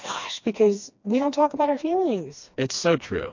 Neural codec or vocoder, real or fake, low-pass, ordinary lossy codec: codec, 16 kHz, 2 kbps, FreqCodec, smaller model; fake; 7.2 kHz; MP3, 48 kbps